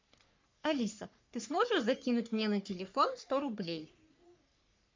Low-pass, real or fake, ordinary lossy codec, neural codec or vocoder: 7.2 kHz; fake; MP3, 64 kbps; codec, 44.1 kHz, 3.4 kbps, Pupu-Codec